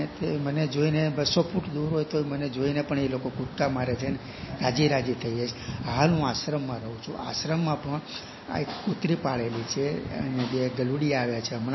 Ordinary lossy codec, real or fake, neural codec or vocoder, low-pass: MP3, 24 kbps; real; none; 7.2 kHz